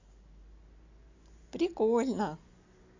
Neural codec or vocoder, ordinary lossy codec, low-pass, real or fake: none; AAC, 48 kbps; 7.2 kHz; real